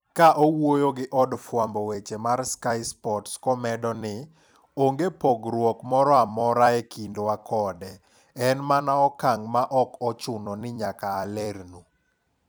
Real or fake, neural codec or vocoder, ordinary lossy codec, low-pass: fake; vocoder, 44.1 kHz, 128 mel bands every 256 samples, BigVGAN v2; none; none